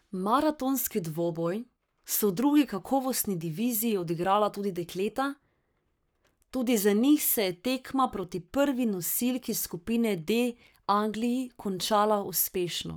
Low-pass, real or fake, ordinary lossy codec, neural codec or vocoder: none; fake; none; codec, 44.1 kHz, 7.8 kbps, Pupu-Codec